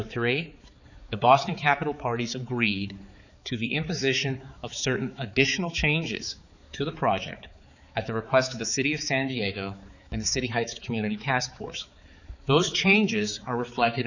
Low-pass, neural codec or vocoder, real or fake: 7.2 kHz; codec, 16 kHz, 4 kbps, X-Codec, HuBERT features, trained on balanced general audio; fake